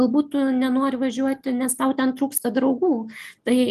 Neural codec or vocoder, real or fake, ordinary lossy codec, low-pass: none; real; Opus, 32 kbps; 14.4 kHz